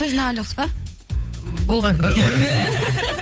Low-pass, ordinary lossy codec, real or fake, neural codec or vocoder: none; none; fake; codec, 16 kHz, 8 kbps, FunCodec, trained on Chinese and English, 25 frames a second